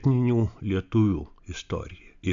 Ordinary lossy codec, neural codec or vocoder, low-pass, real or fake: AAC, 64 kbps; none; 7.2 kHz; real